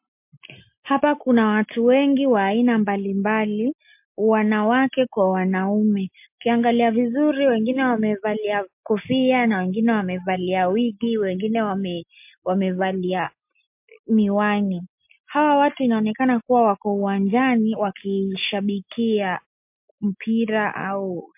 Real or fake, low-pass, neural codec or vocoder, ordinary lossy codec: real; 3.6 kHz; none; MP3, 32 kbps